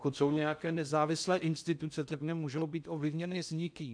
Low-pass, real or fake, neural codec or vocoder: 9.9 kHz; fake; codec, 16 kHz in and 24 kHz out, 0.6 kbps, FocalCodec, streaming, 4096 codes